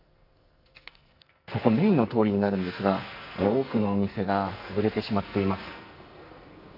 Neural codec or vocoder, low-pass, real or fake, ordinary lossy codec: codec, 44.1 kHz, 2.6 kbps, SNAC; 5.4 kHz; fake; none